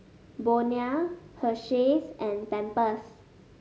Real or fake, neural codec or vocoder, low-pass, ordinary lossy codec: real; none; none; none